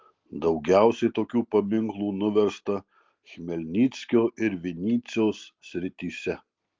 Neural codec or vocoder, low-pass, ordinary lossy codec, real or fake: none; 7.2 kHz; Opus, 32 kbps; real